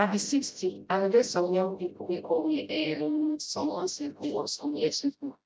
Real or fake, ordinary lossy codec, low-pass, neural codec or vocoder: fake; none; none; codec, 16 kHz, 0.5 kbps, FreqCodec, smaller model